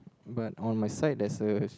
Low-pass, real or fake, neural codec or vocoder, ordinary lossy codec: none; real; none; none